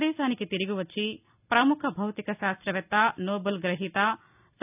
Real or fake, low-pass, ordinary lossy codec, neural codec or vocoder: real; 3.6 kHz; none; none